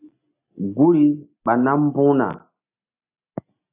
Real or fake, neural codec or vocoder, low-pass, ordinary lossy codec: real; none; 3.6 kHz; AAC, 24 kbps